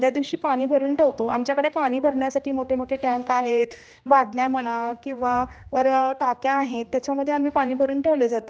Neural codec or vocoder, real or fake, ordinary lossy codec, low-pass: codec, 16 kHz, 1 kbps, X-Codec, HuBERT features, trained on general audio; fake; none; none